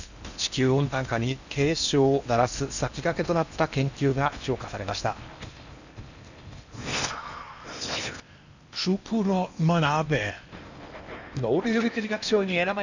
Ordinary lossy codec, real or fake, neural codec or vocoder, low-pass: none; fake; codec, 16 kHz in and 24 kHz out, 0.8 kbps, FocalCodec, streaming, 65536 codes; 7.2 kHz